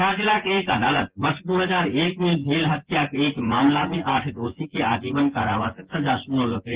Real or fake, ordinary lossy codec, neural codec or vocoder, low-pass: fake; Opus, 16 kbps; vocoder, 24 kHz, 100 mel bands, Vocos; 3.6 kHz